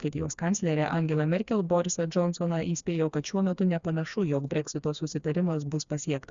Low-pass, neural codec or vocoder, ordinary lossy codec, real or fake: 7.2 kHz; codec, 16 kHz, 2 kbps, FreqCodec, smaller model; Opus, 64 kbps; fake